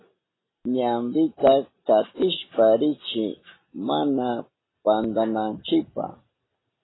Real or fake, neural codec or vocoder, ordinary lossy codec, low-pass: real; none; AAC, 16 kbps; 7.2 kHz